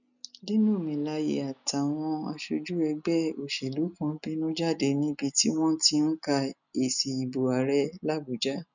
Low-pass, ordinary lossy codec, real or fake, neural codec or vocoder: 7.2 kHz; none; real; none